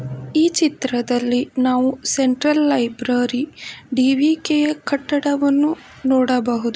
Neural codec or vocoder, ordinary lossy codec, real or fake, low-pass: none; none; real; none